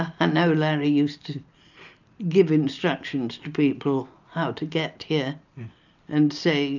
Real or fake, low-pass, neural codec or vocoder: real; 7.2 kHz; none